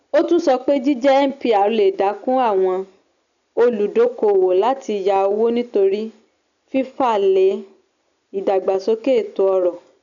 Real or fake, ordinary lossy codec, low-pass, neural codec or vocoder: real; none; 7.2 kHz; none